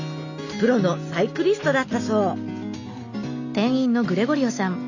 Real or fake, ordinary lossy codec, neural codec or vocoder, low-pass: real; none; none; 7.2 kHz